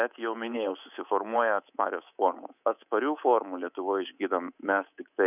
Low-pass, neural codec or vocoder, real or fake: 3.6 kHz; codec, 24 kHz, 3.1 kbps, DualCodec; fake